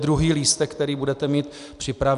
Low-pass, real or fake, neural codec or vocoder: 10.8 kHz; real; none